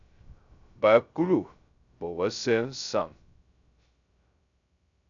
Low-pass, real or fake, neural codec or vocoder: 7.2 kHz; fake; codec, 16 kHz, 0.2 kbps, FocalCodec